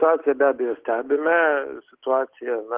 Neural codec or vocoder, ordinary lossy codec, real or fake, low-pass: none; Opus, 16 kbps; real; 3.6 kHz